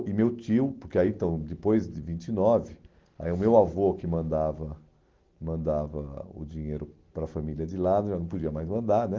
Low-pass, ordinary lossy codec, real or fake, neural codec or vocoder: 7.2 kHz; Opus, 16 kbps; real; none